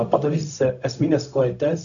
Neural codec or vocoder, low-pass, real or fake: codec, 16 kHz, 0.4 kbps, LongCat-Audio-Codec; 7.2 kHz; fake